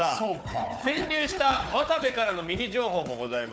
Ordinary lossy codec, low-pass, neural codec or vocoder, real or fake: none; none; codec, 16 kHz, 4 kbps, FunCodec, trained on Chinese and English, 50 frames a second; fake